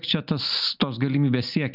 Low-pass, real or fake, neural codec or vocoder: 5.4 kHz; real; none